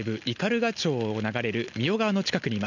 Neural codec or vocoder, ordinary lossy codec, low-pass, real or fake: none; none; 7.2 kHz; real